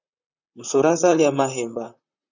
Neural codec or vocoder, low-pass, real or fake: vocoder, 44.1 kHz, 128 mel bands, Pupu-Vocoder; 7.2 kHz; fake